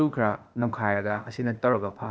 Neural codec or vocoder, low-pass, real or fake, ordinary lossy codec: codec, 16 kHz, 0.8 kbps, ZipCodec; none; fake; none